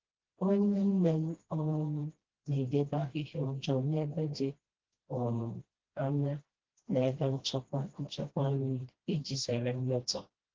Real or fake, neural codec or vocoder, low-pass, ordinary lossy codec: fake; codec, 16 kHz, 1 kbps, FreqCodec, smaller model; 7.2 kHz; Opus, 16 kbps